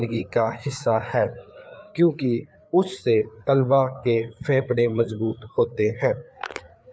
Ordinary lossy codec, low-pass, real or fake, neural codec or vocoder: none; none; fake; codec, 16 kHz, 4 kbps, FreqCodec, larger model